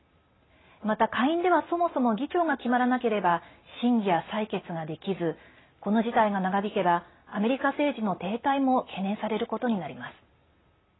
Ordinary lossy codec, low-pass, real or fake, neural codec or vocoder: AAC, 16 kbps; 7.2 kHz; real; none